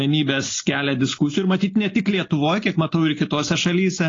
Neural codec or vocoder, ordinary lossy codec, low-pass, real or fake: none; AAC, 32 kbps; 7.2 kHz; real